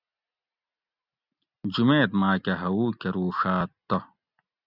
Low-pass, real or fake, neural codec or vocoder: 5.4 kHz; real; none